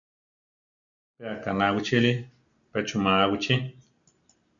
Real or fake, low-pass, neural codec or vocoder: real; 7.2 kHz; none